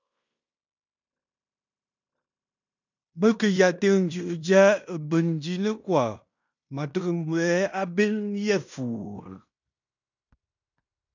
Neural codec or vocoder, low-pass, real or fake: codec, 16 kHz in and 24 kHz out, 0.9 kbps, LongCat-Audio-Codec, fine tuned four codebook decoder; 7.2 kHz; fake